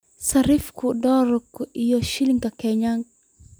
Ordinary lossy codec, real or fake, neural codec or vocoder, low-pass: none; real; none; none